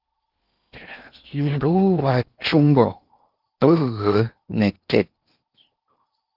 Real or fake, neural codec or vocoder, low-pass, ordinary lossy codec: fake; codec, 16 kHz in and 24 kHz out, 0.8 kbps, FocalCodec, streaming, 65536 codes; 5.4 kHz; Opus, 24 kbps